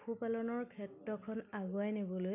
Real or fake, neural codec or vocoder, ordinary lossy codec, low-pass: real; none; none; 3.6 kHz